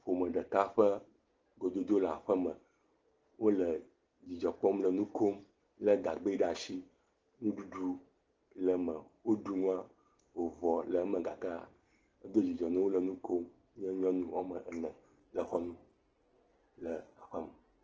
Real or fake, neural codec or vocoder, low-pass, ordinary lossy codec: real; none; 7.2 kHz; Opus, 16 kbps